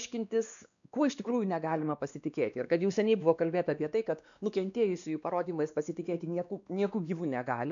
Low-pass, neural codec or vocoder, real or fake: 7.2 kHz; codec, 16 kHz, 2 kbps, X-Codec, WavLM features, trained on Multilingual LibriSpeech; fake